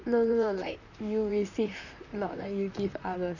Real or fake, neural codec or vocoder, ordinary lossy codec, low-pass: fake; vocoder, 44.1 kHz, 128 mel bands, Pupu-Vocoder; none; 7.2 kHz